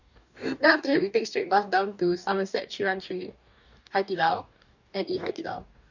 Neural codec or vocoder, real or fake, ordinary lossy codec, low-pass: codec, 44.1 kHz, 2.6 kbps, DAC; fake; none; 7.2 kHz